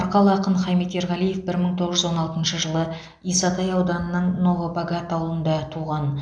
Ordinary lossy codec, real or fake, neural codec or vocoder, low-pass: none; real; none; 9.9 kHz